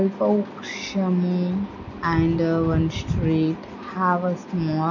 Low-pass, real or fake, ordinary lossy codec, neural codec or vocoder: 7.2 kHz; real; none; none